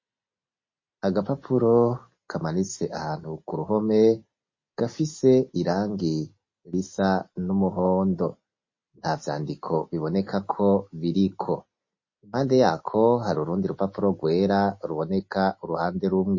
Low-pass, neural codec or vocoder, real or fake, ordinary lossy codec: 7.2 kHz; none; real; MP3, 32 kbps